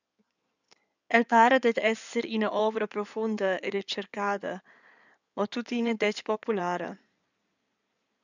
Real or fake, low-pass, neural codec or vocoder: fake; 7.2 kHz; codec, 16 kHz in and 24 kHz out, 2.2 kbps, FireRedTTS-2 codec